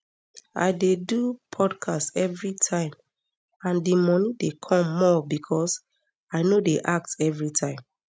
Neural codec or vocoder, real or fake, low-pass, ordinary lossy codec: none; real; none; none